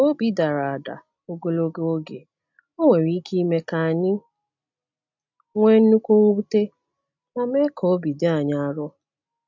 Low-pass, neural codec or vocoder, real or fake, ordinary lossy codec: 7.2 kHz; none; real; MP3, 64 kbps